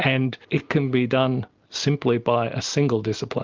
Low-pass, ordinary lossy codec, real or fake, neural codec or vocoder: 7.2 kHz; Opus, 24 kbps; real; none